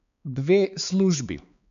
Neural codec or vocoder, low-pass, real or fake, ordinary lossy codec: codec, 16 kHz, 4 kbps, X-Codec, HuBERT features, trained on balanced general audio; 7.2 kHz; fake; none